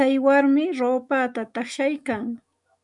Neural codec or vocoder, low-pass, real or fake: autoencoder, 48 kHz, 128 numbers a frame, DAC-VAE, trained on Japanese speech; 10.8 kHz; fake